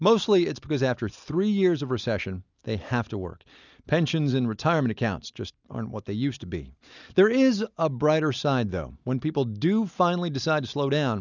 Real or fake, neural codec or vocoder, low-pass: real; none; 7.2 kHz